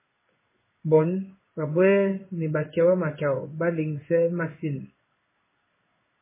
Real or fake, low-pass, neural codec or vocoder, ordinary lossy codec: fake; 3.6 kHz; codec, 16 kHz in and 24 kHz out, 1 kbps, XY-Tokenizer; MP3, 16 kbps